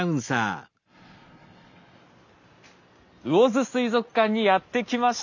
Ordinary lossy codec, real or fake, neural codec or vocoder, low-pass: none; real; none; 7.2 kHz